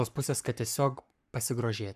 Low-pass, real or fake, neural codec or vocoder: 14.4 kHz; fake; codec, 44.1 kHz, 7.8 kbps, Pupu-Codec